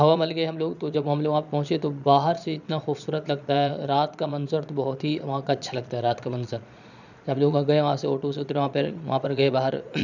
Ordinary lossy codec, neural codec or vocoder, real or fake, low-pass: none; vocoder, 22.05 kHz, 80 mel bands, Vocos; fake; 7.2 kHz